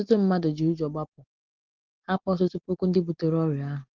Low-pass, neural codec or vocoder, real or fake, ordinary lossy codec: 7.2 kHz; none; real; Opus, 16 kbps